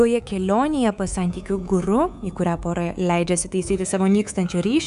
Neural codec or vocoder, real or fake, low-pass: codec, 24 kHz, 3.1 kbps, DualCodec; fake; 10.8 kHz